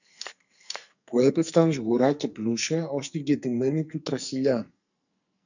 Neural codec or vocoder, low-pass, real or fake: codec, 44.1 kHz, 2.6 kbps, SNAC; 7.2 kHz; fake